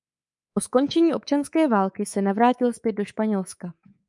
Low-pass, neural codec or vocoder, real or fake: 10.8 kHz; codec, 24 kHz, 3.1 kbps, DualCodec; fake